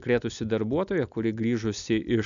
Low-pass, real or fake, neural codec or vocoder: 7.2 kHz; real; none